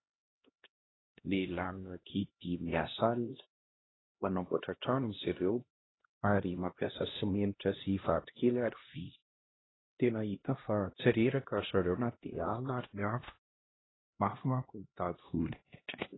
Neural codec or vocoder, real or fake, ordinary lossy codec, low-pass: codec, 16 kHz, 1 kbps, X-Codec, HuBERT features, trained on LibriSpeech; fake; AAC, 16 kbps; 7.2 kHz